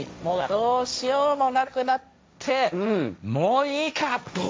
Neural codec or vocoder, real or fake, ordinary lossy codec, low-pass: codec, 16 kHz, 1.1 kbps, Voila-Tokenizer; fake; none; none